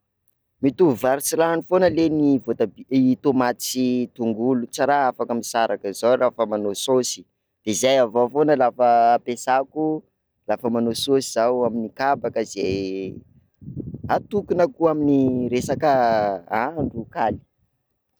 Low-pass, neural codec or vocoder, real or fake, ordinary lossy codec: none; none; real; none